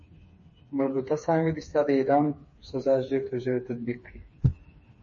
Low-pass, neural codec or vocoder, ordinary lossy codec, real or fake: 7.2 kHz; codec, 16 kHz, 4 kbps, FreqCodec, smaller model; MP3, 32 kbps; fake